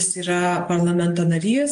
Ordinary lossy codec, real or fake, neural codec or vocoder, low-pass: AAC, 64 kbps; real; none; 10.8 kHz